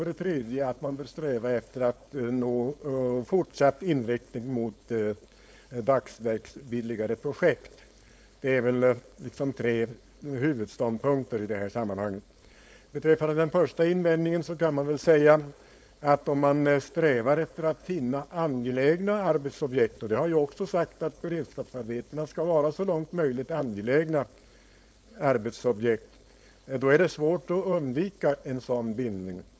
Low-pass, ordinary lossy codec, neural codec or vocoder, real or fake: none; none; codec, 16 kHz, 4.8 kbps, FACodec; fake